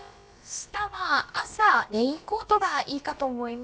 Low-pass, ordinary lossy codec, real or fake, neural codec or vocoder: none; none; fake; codec, 16 kHz, about 1 kbps, DyCAST, with the encoder's durations